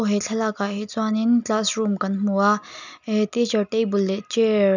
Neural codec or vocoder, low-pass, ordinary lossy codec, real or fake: none; 7.2 kHz; none; real